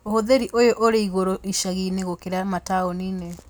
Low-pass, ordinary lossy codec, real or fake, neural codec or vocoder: none; none; real; none